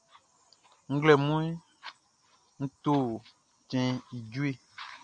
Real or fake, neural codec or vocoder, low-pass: real; none; 9.9 kHz